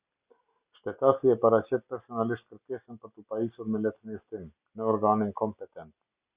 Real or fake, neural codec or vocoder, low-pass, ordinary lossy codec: real; none; 3.6 kHz; Opus, 64 kbps